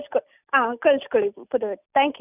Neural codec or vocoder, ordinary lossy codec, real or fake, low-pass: none; none; real; 3.6 kHz